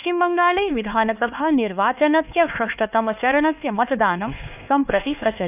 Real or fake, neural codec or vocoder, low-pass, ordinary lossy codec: fake; codec, 16 kHz, 2 kbps, X-Codec, HuBERT features, trained on LibriSpeech; 3.6 kHz; none